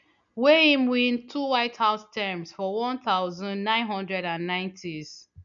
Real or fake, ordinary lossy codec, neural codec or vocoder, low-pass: real; none; none; 7.2 kHz